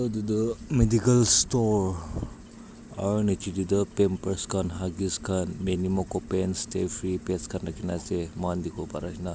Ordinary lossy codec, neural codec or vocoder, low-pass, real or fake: none; none; none; real